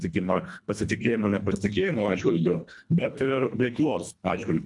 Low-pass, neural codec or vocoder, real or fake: 10.8 kHz; codec, 24 kHz, 1.5 kbps, HILCodec; fake